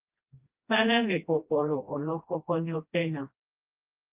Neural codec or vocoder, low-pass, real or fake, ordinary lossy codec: codec, 16 kHz, 1 kbps, FreqCodec, smaller model; 3.6 kHz; fake; Opus, 24 kbps